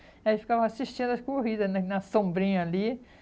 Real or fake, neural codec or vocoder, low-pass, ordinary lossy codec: real; none; none; none